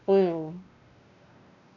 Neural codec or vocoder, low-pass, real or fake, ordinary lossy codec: codec, 16 kHz, 2 kbps, X-Codec, WavLM features, trained on Multilingual LibriSpeech; 7.2 kHz; fake; none